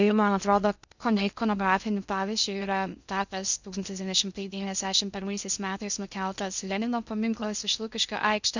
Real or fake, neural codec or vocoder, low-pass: fake; codec, 16 kHz in and 24 kHz out, 0.6 kbps, FocalCodec, streaming, 2048 codes; 7.2 kHz